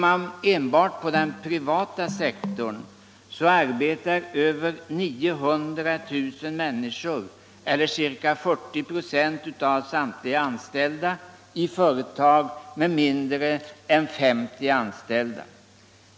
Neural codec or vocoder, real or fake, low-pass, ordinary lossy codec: none; real; none; none